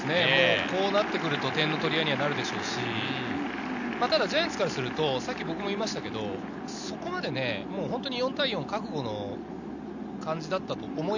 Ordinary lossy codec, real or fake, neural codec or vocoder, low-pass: none; real; none; 7.2 kHz